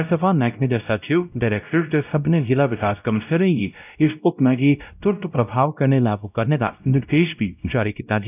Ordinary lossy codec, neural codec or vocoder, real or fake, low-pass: none; codec, 16 kHz, 0.5 kbps, X-Codec, WavLM features, trained on Multilingual LibriSpeech; fake; 3.6 kHz